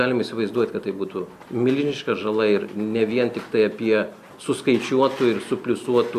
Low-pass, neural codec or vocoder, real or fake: 14.4 kHz; none; real